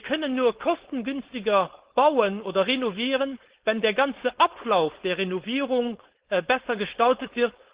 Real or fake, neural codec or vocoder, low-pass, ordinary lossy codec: fake; codec, 16 kHz, 4.8 kbps, FACodec; 3.6 kHz; Opus, 16 kbps